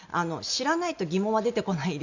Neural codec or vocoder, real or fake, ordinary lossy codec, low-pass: none; real; none; 7.2 kHz